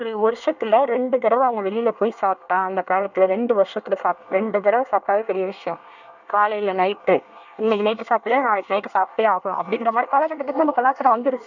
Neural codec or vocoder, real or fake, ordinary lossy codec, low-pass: codec, 24 kHz, 1 kbps, SNAC; fake; none; 7.2 kHz